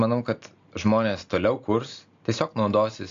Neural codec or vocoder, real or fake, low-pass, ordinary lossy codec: none; real; 7.2 kHz; AAC, 48 kbps